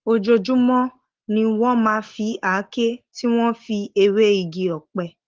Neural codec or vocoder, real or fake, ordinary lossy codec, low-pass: none; real; Opus, 16 kbps; 7.2 kHz